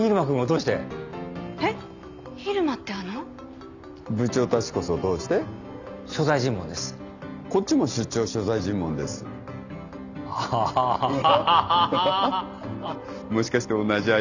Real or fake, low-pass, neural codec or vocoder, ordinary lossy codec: real; 7.2 kHz; none; none